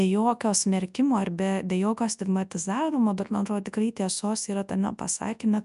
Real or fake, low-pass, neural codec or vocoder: fake; 10.8 kHz; codec, 24 kHz, 0.9 kbps, WavTokenizer, large speech release